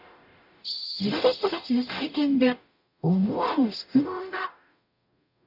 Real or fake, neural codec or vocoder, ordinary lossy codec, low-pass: fake; codec, 44.1 kHz, 0.9 kbps, DAC; none; 5.4 kHz